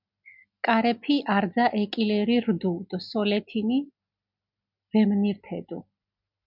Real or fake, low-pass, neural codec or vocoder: real; 5.4 kHz; none